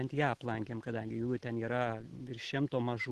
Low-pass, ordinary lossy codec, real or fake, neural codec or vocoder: 10.8 kHz; Opus, 16 kbps; real; none